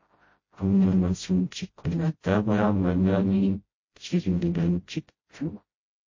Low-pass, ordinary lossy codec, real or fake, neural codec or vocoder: 7.2 kHz; MP3, 32 kbps; fake; codec, 16 kHz, 0.5 kbps, FreqCodec, smaller model